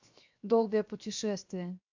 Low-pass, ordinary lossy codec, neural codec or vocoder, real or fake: 7.2 kHz; MP3, 64 kbps; codec, 16 kHz, 0.7 kbps, FocalCodec; fake